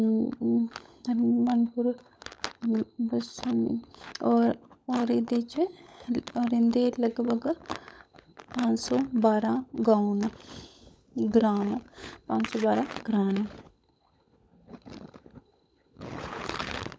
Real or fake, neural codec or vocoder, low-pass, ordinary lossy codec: fake; codec, 16 kHz, 16 kbps, FunCodec, trained on LibriTTS, 50 frames a second; none; none